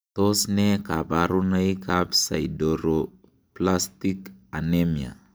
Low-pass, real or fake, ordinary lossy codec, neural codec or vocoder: none; real; none; none